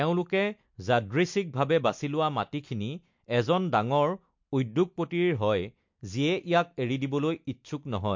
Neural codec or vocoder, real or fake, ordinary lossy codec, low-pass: none; real; MP3, 48 kbps; 7.2 kHz